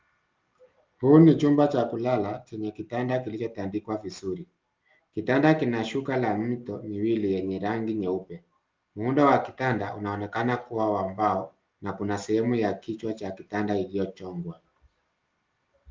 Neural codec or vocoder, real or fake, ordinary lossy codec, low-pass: none; real; Opus, 32 kbps; 7.2 kHz